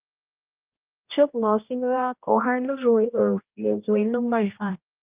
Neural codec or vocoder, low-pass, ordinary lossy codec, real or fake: codec, 16 kHz, 1 kbps, X-Codec, HuBERT features, trained on general audio; 3.6 kHz; Opus, 24 kbps; fake